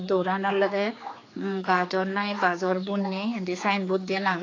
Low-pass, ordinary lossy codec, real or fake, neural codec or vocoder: 7.2 kHz; AAC, 32 kbps; fake; codec, 16 kHz, 4 kbps, X-Codec, HuBERT features, trained on general audio